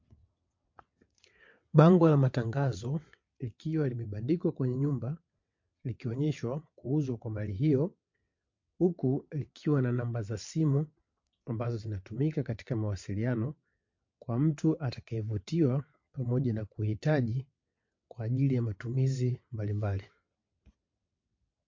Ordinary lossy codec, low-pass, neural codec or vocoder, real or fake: MP3, 48 kbps; 7.2 kHz; vocoder, 22.05 kHz, 80 mel bands, WaveNeXt; fake